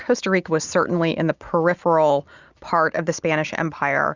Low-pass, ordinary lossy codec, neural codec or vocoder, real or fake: 7.2 kHz; Opus, 64 kbps; none; real